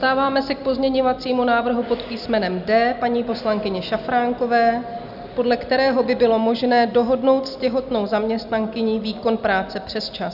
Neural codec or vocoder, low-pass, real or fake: none; 5.4 kHz; real